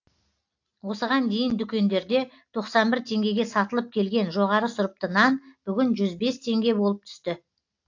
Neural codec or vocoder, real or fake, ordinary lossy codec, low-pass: none; real; AAC, 48 kbps; 7.2 kHz